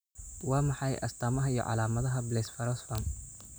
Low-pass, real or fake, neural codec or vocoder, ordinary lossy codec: none; real; none; none